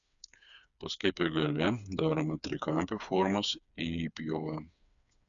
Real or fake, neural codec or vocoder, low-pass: fake; codec, 16 kHz, 4 kbps, FreqCodec, smaller model; 7.2 kHz